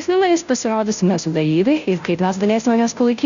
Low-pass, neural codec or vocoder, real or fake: 7.2 kHz; codec, 16 kHz, 0.5 kbps, FunCodec, trained on Chinese and English, 25 frames a second; fake